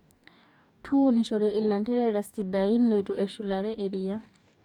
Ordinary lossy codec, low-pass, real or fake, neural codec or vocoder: none; 19.8 kHz; fake; codec, 44.1 kHz, 2.6 kbps, DAC